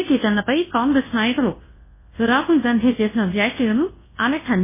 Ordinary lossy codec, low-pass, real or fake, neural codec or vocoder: MP3, 16 kbps; 3.6 kHz; fake; codec, 24 kHz, 0.9 kbps, WavTokenizer, large speech release